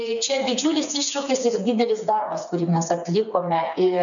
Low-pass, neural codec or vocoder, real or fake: 7.2 kHz; codec, 16 kHz, 4 kbps, FreqCodec, smaller model; fake